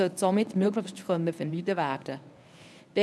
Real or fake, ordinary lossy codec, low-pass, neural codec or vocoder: fake; none; none; codec, 24 kHz, 0.9 kbps, WavTokenizer, medium speech release version 1